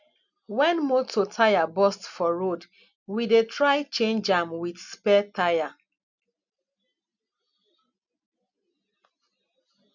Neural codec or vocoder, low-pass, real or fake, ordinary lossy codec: none; 7.2 kHz; real; none